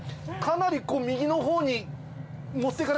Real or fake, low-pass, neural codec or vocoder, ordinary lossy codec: real; none; none; none